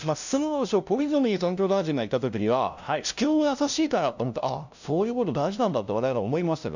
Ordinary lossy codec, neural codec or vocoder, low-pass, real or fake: none; codec, 16 kHz, 0.5 kbps, FunCodec, trained on LibriTTS, 25 frames a second; 7.2 kHz; fake